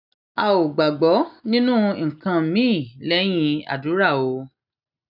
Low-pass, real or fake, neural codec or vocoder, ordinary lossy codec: 5.4 kHz; real; none; none